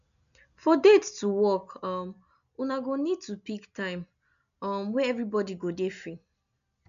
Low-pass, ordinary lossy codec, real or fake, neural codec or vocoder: 7.2 kHz; none; real; none